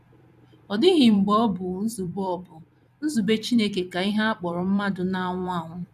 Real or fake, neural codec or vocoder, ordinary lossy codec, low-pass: fake; vocoder, 44.1 kHz, 128 mel bands every 256 samples, BigVGAN v2; none; 14.4 kHz